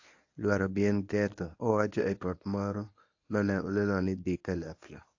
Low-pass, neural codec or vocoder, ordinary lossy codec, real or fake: 7.2 kHz; codec, 24 kHz, 0.9 kbps, WavTokenizer, medium speech release version 1; AAC, 48 kbps; fake